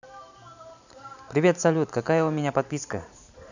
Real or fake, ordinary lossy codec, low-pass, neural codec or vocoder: fake; none; 7.2 kHz; vocoder, 44.1 kHz, 128 mel bands every 512 samples, BigVGAN v2